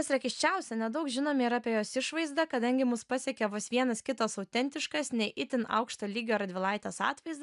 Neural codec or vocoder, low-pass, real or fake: none; 10.8 kHz; real